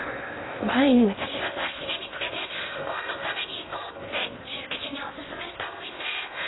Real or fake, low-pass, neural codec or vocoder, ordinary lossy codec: fake; 7.2 kHz; codec, 16 kHz in and 24 kHz out, 0.6 kbps, FocalCodec, streaming, 2048 codes; AAC, 16 kbps